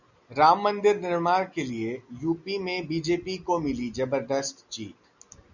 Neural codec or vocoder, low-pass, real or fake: none; 7.2 kHz; real